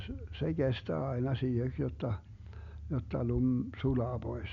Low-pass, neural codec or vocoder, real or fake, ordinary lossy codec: 7.2 kHz; none; real; MP3, 64 kbps